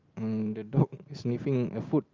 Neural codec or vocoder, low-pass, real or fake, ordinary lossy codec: none; 7.2 kHz; real; Opus, 32 kbps